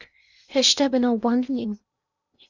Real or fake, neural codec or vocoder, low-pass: fake; codec, 16 kHz in and 24 kHz out, 0.8 kbps, FocalCodec, streaming, 65536 codes; 7.2 kHz